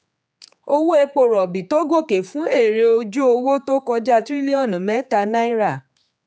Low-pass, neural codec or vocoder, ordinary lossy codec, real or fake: none; codec, 16 kHz, 4 kbps, X-Codec, HuBERT features, trained on general audio; none; fake